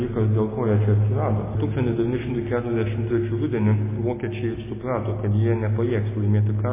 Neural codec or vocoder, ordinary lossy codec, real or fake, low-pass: none; MP3, 16 kbps; real; 3.6 kHz